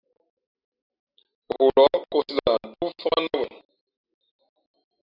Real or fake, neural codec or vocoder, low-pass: real; none; 5.4 kHz